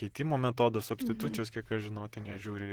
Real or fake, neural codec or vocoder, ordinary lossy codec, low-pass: fake; vocoder, 44.1 kHz, 128 mel bands, Pupu-Vocoder; Opus, 16 kbps; 19.8 kHz